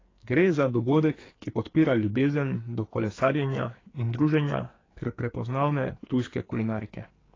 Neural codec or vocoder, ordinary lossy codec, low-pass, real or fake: codec, 44.1 kHz, 2.6 kbps, SNAC; AAC, 32 kbps; 7.2 kHz; fake